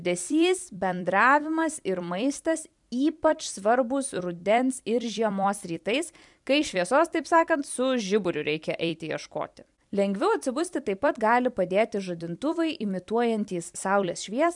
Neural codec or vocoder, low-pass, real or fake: vocoder, 44.1 kHz, 128 mel bands every 256 samples, BigVGAN v2; 10.8 kHz; fake